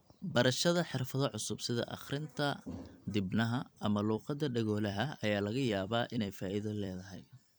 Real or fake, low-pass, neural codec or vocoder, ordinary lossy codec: fake; none; vocoder, 44.1 kHz, 128 mel bands every 256 samples, BigVGAN v2; none